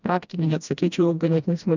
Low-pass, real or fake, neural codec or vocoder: 7.2 kHz; fake; codec, 16 kHz, 1 kbps, FreqCodec, smaller model